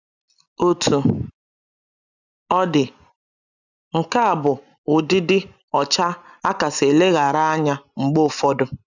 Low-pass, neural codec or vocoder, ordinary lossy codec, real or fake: 7.2 kHz; none; none; real